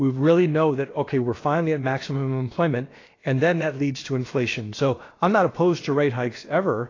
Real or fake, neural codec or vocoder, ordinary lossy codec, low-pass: fake; codec, 16 kHz, about 1 kbps, DyCAST, with the encoder's durations; AAC, 32 kbps; 7.2 kHz